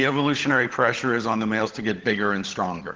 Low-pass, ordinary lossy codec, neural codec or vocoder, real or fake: 7.2 kHz; Opus, 16 kbps; codec, 16 kHz, 16 kbps, FunCodec, trained on LibriTTS, 50 frames a second; fake